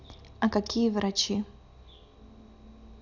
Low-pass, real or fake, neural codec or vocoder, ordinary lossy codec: 7.2 kHz; real; none; none